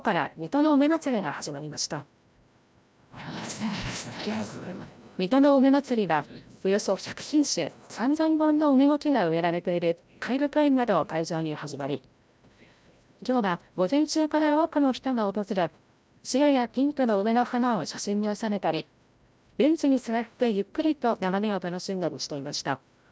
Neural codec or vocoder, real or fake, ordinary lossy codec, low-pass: codec, 16 kHz, 0.5 kbps, FreqCodec, larger model; fake; none; none